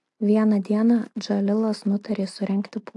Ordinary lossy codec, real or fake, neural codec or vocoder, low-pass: AAC, 64 kbps; real; none; 10.8 kHz